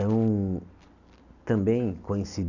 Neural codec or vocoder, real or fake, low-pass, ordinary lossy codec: none; real; 7.2 kHz; Opus, 64 kbps